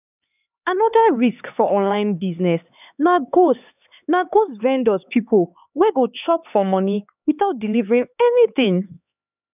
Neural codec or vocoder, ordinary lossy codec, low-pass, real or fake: codec, 16 kHz, 4 kbps, X-Codec, HuBERT features, trained on LibriSpeech; none; 3.6 kHz; fake